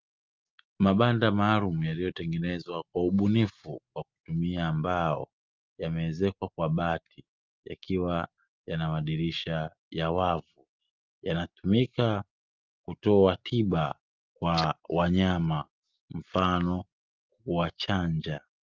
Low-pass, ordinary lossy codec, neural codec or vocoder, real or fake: 7.2 kHz; Opus, 32 kbps; none; real